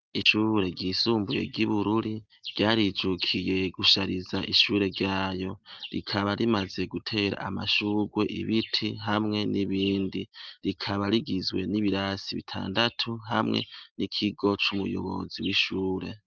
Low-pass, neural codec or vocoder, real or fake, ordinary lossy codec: 7.2 kHz; none; real; Opus, 32 kbps